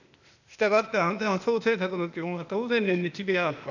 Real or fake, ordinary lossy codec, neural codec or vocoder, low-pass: fake; none; codec, 16 kHz, 0.8 kbps, ZipCodec; 7.2 kHz